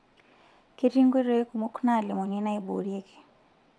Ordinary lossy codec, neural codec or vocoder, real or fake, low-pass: none; vocoder, 22.05 kHz, 80 mel bands, WaveNeXt; fake; none